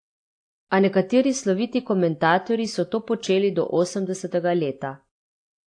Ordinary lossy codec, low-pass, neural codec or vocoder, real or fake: AAC, 48 kbps; 9.9 kHz; none; real